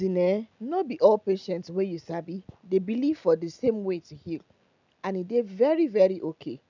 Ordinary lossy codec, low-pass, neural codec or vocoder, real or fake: none; 7.2 kHz; none; real